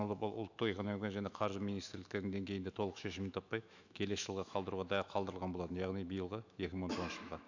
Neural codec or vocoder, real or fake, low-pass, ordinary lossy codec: autoencoder, 48 kHz, 128 numbers a frame, DAC-VAE, trained on Japanese speech; fake; 7.2 kHz; none